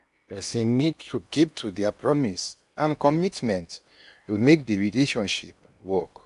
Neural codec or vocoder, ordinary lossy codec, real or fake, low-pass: codec, 16 kHz in and 24 kHz out, 0.8 kbps, FocalCodec, streaming, 65536 codes; none; fake; 10.8 kHz